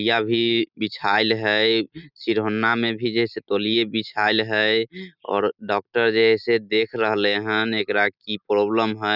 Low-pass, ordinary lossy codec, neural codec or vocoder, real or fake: 5.4 kHz; none; none; real